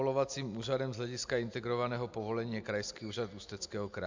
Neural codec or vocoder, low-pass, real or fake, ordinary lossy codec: none; 7.2 kHz; real; MP3, 64 kbps